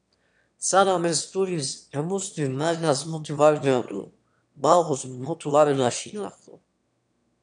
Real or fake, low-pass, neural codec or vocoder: fake; 9.9 kHz; autoencoder, 22.05 kHz, a latent of 192 numbers a frame, VITS, trained on one speaker